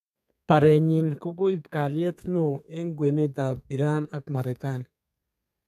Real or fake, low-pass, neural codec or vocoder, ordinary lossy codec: fake; 14.4 kHz; codec, 32 kHz, 1.9 kbps, SNAC; none